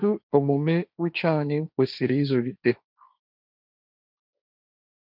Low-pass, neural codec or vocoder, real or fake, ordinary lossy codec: 5.4 kHz; codec, 16 kHz, 1.1 kbps, Voila-Tokenizer; fake; none